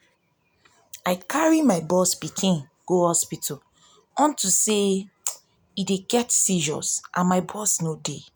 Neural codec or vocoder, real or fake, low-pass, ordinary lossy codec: none; real; none; none